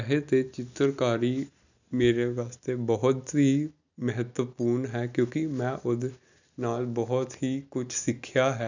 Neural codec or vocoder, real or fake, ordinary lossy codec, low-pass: none; real; none; 7.2 kHz